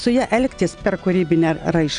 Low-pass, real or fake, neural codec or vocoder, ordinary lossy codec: 9.9 kHz; real; none; Opus, 64 kbps